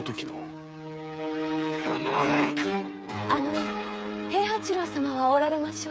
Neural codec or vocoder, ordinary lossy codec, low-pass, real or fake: codec, 16 kHz, 8 kbps, FreqCodec, smaller model; none; none; fake